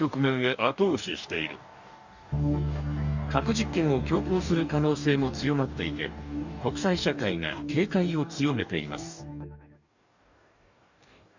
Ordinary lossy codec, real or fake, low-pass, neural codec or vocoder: none; fake; 7.2 kHz; codec, 44.1 kHz, 2.6 kbps, DAC